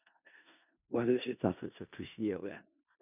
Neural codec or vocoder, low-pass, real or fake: codec, 16 kHz in and 24 kHz out, 0.4 kbps, LongCat-Audio-Codec, four codebook decoder; 3.6 kHz; fake